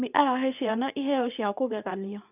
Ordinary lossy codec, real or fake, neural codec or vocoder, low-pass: none; fake; codec, 24 kHz, 0.9 kbps, WavTokenizer, medium speech release version 2; 3.6 kHz